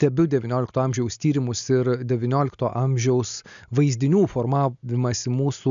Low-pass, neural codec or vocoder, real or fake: 7.2 kHz; none; real